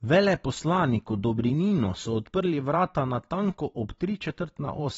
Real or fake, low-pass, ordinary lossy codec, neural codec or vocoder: fake; 19.8 kHz; AAC, 24 kbps; vocoder, 44.1 kHz, 128 mel bands every 512 samples, BigVGAN v2